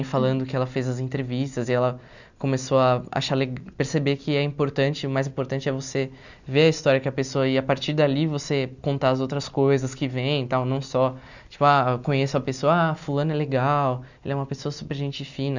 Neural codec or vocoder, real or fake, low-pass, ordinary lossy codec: none; real; 7.2 kHz; none